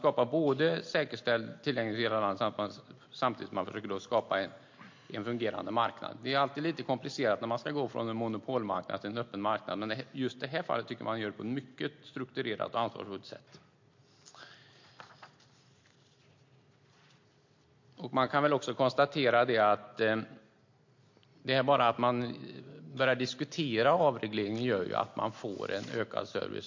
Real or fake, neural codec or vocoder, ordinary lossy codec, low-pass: real; none; MP3, 48 kbps; 7.2 kHz